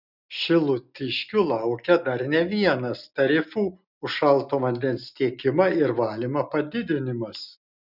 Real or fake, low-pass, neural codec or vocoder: real; 5.4 kHz; none